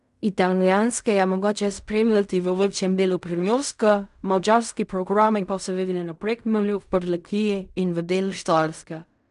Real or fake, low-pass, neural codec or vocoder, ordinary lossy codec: fake; 10.8 kHz; codec, 16 kHz in and 24 kHz out, 0.4 kbps, LongCat-Audio-Codec, fine tuned four codebook decoder; none